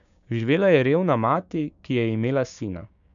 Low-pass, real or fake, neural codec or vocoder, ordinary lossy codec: 7.2 kHz; fake; codec, 16 kHz, 6 kbps, DAC; none